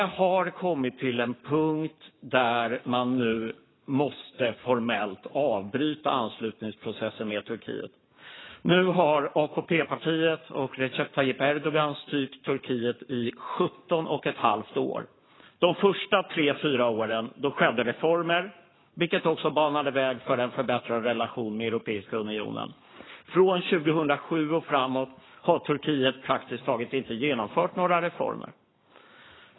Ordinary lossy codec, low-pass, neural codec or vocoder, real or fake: AAC, 16 kbps; 7.2 kHz; codec, 44.1 kHz, 7.8 kbps, Pupu-Codec; fake